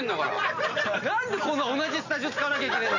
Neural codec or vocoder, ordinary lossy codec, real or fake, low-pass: none; none; real; 7.2 kHz